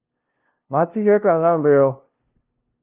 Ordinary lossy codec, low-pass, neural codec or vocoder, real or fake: Opus, 24 kbps; 3.6 kHz; codec, 16 kHz, 0.5 kbps, FunCodec, trained on LibriTTS, 25 frames a second; fake